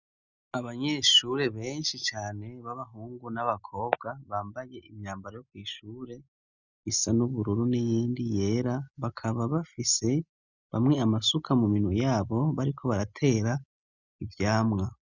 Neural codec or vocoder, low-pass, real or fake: none; 7.2 kHz; real